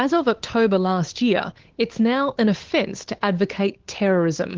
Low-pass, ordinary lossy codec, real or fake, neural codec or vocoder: 7.2 kHz; Opus, 16 kbps; fake; codec, 16 kHz, 8 kbps, FunCodec, trained on Chinese and English, 25 frames a second